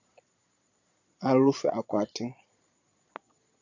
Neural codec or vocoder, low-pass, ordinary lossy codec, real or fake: vocoder, 22.05 kHz, 80 mel bands, Vocos; 7.2 kHz; AAC, 48 kbps; fake